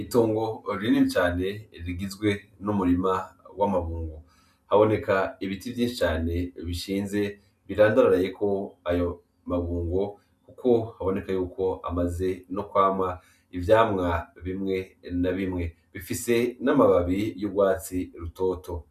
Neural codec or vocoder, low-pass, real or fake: none; 14.4 kHz; real